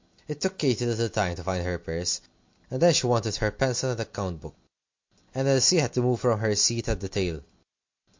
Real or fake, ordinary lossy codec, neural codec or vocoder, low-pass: real; MP3, 48 kbps; none; 7.2 kHz